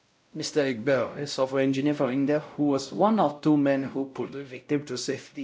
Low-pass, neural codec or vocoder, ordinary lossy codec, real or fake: none; codec, 16 kHz, 0.5 kbps, X-Codec, WavLM features, trained on Multilingual LibriSpeech; none; fake